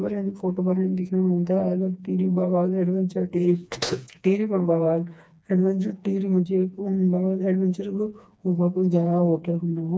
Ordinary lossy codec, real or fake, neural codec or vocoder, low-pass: none; fake; codec, 16 kHz, 2 kbps, FreqCodec, smaller model; none